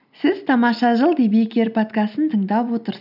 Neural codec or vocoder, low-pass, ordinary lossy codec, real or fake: none; 5.4 kHz; none; real